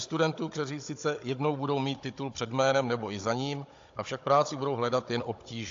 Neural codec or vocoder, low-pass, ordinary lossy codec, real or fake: codec, 16 kHz, 16 kbps, FunCodec, trained on Chinese and English, 50 frames a second; 7.2 kHz; AAC, 48 kbps; fake